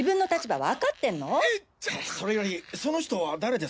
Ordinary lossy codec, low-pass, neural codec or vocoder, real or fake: none; none; none; real